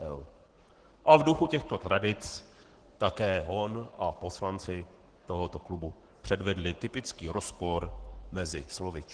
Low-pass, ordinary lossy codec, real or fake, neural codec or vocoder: 9.9 kHz; Opus, 16 kbps; fake; codec, 44.1 kHz, 7.8 kbps, Pupu-Codec